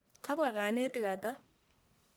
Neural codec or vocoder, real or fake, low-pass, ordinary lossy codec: codec, 44.1 kHz, 1.7 kbps, Pupu-Codec; fake; none; none